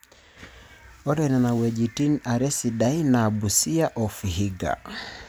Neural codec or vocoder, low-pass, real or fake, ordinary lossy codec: none; none; real; none